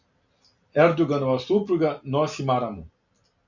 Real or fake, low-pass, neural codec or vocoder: real; 7.2 kHz; none